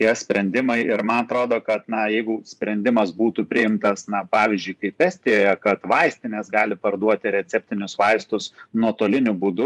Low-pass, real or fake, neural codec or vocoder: 10.8 kHz; fake; vocoder, 24 kHz, 100 mel bands, Vocos